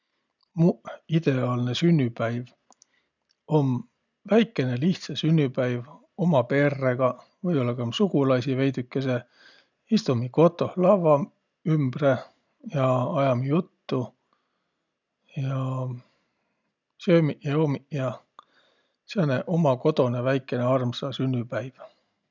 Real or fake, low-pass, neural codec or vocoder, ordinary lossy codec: real; 7.2 kHz; none; none